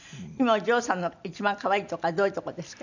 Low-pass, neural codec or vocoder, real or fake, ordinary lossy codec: 7.2 kHz; none; real; none